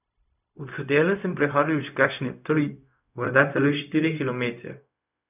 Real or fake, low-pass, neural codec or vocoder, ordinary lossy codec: fake; 3.6 kHz; codec, 16 kHz, 0.4 kbps, LongCat-Audio-Codec; none